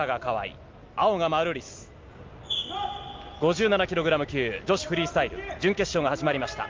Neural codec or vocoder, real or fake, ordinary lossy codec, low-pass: none; real; Opus, 32 kbps; 7.2 kHz